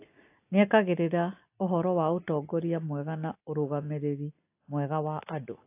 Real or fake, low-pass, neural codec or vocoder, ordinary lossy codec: real; 3.6 kHz; none; AAC, 24 kbps